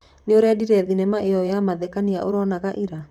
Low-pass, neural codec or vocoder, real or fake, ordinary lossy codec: 19.8 kHz; vocoder, 44.1 kHz, 128 mel bands, Pupu-Vocoder; fake; none